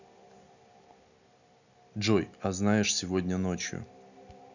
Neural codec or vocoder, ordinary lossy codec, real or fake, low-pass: none; none; real; 7.2 kHz